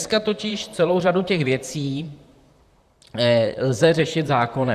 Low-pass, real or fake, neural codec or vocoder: 14.4 kHz; fake; vocoder, 44.1 kHz, 128 mel bands, Pupu-Vocoder